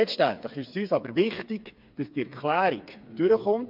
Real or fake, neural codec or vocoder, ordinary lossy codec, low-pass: fake; codec, 16 kHz, 4 kbps, FreqCodec, smaller model; MP3, 48 kbps; 5.4 kHz